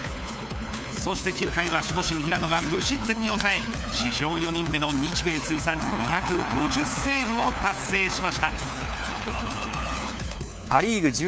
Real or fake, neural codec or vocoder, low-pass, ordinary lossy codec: fake; codec, 16 kHz, 4 kbps, FunCodec, trained on LibriTTS, 50 frames a second; none; none